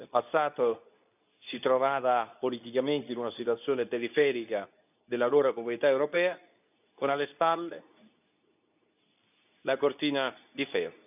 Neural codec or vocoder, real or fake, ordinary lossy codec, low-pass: codec, 24 kHz, 0.9 kbps, WavTokenizer, medium speech release version 2; fake; none; 3.6 kHz